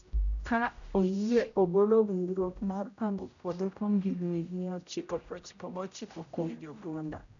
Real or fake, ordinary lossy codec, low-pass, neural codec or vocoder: fake; none; 7.2 kHz; codec, 16 kHz, 0.5 kbps, X-Codec, HuBERT features, trained on general audio